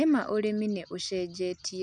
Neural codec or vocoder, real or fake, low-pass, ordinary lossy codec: none; real; 9.9 kHz; none